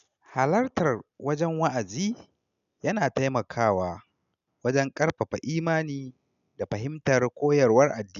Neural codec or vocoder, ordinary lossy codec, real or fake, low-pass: none; none; real; 7.2 kHz